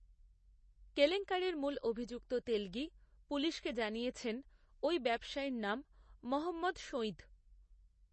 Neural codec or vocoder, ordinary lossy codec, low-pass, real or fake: none; MP3, 32 kbps; 10.8 kHz; real